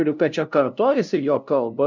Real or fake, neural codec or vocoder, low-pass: fake; codec, 16 kHz, 0.5 kbps, FunCodec, trained on LibriTTS, 25 frames a second; 7.2 kHz